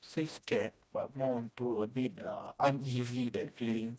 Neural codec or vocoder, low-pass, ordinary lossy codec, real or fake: codec, 16 kHz, 1 kbps, FreqCodec, smaller model; none; none; fake